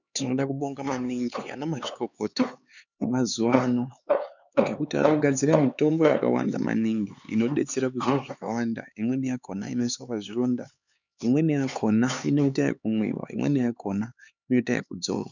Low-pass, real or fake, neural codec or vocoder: 7.2 kHz; fake; codec, 16 kHz, 4 kbps, X-Codec, HuBERT features, trained on LibriSpeech